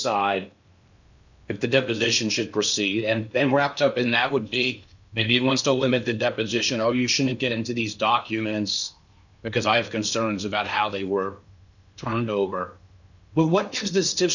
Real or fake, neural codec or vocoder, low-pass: fake; codec, 16 kHz in and 24 kHz out, 0.8 kbps, FocalCodec, streaming, 65536 codes; 7.2 kHz